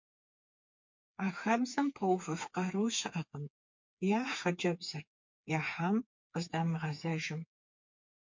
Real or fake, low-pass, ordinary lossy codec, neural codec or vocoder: fake; 7.2 kHz; MP3, 48 kbps; codec, 16 kHz, 4 kbps, FreqCodec, smaller model